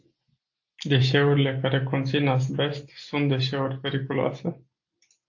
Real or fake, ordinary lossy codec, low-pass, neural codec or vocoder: real; AAC, 48 kbps; 7.2 kHz; none